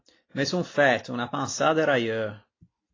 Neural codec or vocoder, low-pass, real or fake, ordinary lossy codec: none; 7.2 kHz; real; AAC, 32 kbps